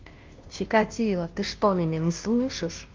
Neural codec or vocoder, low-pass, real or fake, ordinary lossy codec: codec, 16 kHz, 0.5 kbps, FunCodec, trained on Chinese and English, 25 frames a second; 7.2 kHz; fake; Opus, 32 kbps